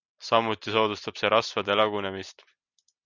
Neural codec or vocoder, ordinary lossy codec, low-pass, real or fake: none; Opus, 64 kbps; 7.2 kHz; real